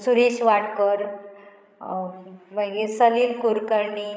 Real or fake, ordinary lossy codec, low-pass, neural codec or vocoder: fake; none; none; codec, 16 kHz, 16 kbps, FreqCodec, larger model